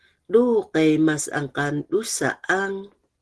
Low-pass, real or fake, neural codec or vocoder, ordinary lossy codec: 10.8 kHz; real; none; Opus, 16 kbps